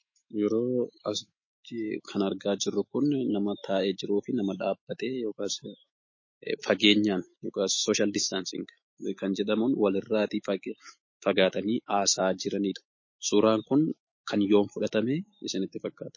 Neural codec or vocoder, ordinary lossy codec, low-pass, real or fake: autoencoder, 48 kHz, 128 numbers a frame, DAC-VAE, trained on Japanese speech; MP3, 32 kbps; 7.2 kHz; fake